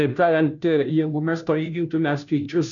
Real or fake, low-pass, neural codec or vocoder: fake; 7.2 kHz; codec, 16 kHz, 0.5 kbps, FunCodec, trained on Chinese and English, 25 frames a second